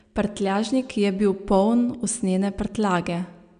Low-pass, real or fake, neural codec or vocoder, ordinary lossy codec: 9.9 kHz; real; none; none